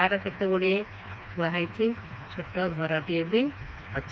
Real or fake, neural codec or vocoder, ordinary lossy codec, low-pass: fake; codec, 16 kHz, 2 kbps, FreqCodec, smaller model; none; none